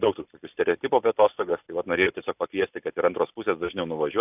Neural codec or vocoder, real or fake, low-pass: none; real; 3.6 kHz